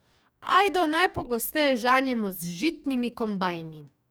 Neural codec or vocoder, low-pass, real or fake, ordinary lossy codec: codec, 44.1 kHz, 2.6 kbps, DAC; none; fake; none